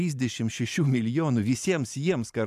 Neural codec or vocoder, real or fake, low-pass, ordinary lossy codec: vocoder, 44.1 kHz, 128 mel bands every 512 samples, BigVGAN v2; fake; 14.4 kHz; AAC, 96 kbps